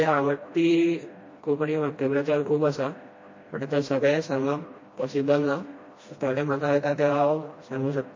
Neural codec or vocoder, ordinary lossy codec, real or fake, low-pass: codec, 16 kHz, 1 kbps, FreqCodec, smaller model; MP3, 32 kbps; fake; 7.2 kHz